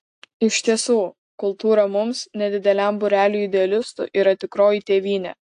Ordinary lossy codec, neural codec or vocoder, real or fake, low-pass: AAC, 64 kbps; none; real; 10.8 kHz